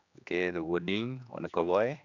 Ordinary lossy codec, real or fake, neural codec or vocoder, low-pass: none; fake; codec, 16 kHz, 2 kbps, X-Codec, HuBERT features, trained on general audio; 7.2 kHz